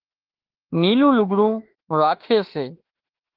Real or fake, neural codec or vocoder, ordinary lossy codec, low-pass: fake; autoencoder, 48 kHz, 32 numbers a frame, DAC-VAE, trained on Japanese speech; Opus, 16 kbps; 5.4 kHz